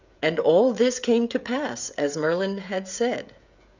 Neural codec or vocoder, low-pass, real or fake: codec, 16 kHz, 16 kbps, FreqCodec, smaller model; 7.2 kHz; fake